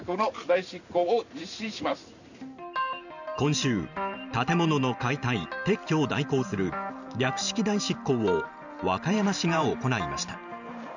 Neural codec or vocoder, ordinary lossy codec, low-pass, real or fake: vocoder, 44.1 kHz, 128 mel bands every 512 samples, BigVGAN v2; none; 7.2 kHz; fake